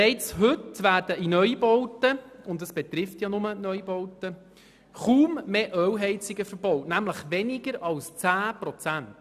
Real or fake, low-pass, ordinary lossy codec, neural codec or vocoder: real; 14.4 kHz; none; none